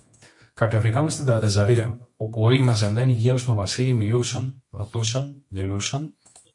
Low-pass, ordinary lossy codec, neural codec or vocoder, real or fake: 10.8 kHz; MP3, 48 kbps; codec, 24 kHz, 0.9 kbps, WavTokenizer, medium music audio release; fake